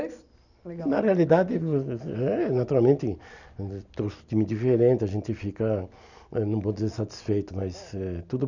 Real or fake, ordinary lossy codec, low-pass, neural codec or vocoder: real; none; 7.2 kHz; none